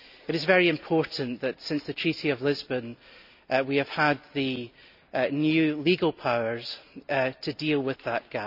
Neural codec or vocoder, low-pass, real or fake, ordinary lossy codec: none; 5.4 kHz; real; none